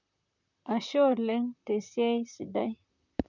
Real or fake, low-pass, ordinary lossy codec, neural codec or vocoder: fake; 7.2 kHz; none; vocoder, 44.1 kHz, 128 mel bands, Pupu-Vocoder